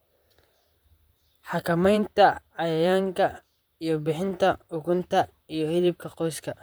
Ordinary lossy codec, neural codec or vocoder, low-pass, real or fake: none; vocoder, 44.1 kHz, 128 mel bands, Pupu-Vocoder; none; fake